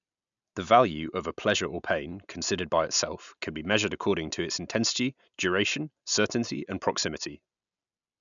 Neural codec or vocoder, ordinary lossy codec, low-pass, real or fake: none; none; 7.2 kHz; real